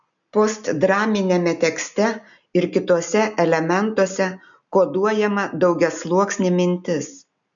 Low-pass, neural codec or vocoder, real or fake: 7.2 kHz; none; real